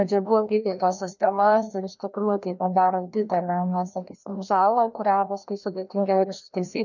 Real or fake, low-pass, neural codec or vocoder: fake; 7.2 kHz; codec, 16 kHz, 1 kbps, FreqCodec, larger model